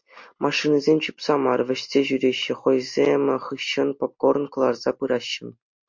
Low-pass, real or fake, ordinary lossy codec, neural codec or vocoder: 7.2 kHz; real; MP3, 48 kbps; none